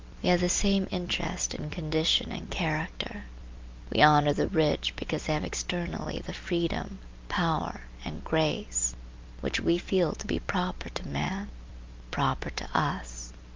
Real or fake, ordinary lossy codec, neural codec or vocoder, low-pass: real; Opus, 32 kbps; none; 7.2 kHz